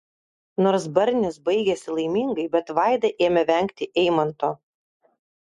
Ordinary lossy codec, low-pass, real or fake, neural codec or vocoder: MP3, 48 kbps; 14.4 kHz; real; none